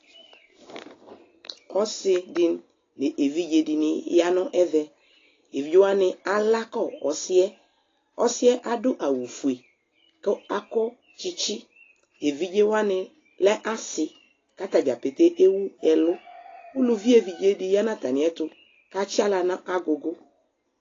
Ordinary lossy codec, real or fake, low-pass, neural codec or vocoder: AAC, 32 kbps; real; 7.2 kHz; none